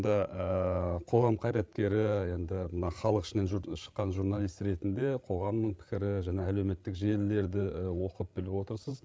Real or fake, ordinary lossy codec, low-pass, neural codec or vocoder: fake; none; none; codec, 16 kHz, 8 kbps, FreqCodec, larger model